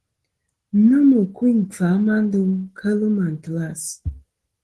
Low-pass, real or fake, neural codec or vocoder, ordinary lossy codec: 10.8 kHz; real; none; Opus, 16 kbps